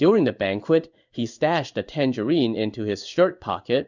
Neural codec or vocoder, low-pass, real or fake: codec, 16 kHz in and 24 kHz out, 1 kbps, XY-Tokenizer; 7.2 kHz; fake